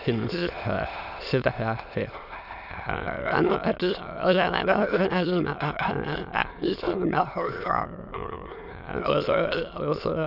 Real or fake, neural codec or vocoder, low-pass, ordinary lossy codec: fake; autoencoder, 22.05 kHz, a latent of 192 numbers a frame, VITS, trained on many speakers; 5.4 kHz; none